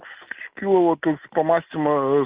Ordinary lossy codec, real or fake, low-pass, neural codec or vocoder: Opus, 32 kbps; real; 3.6 kHz; none